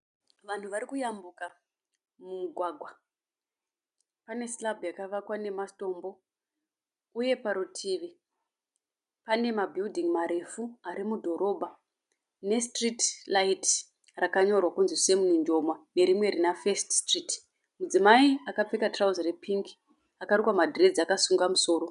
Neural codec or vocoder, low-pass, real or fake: none; 10.8 kHz; real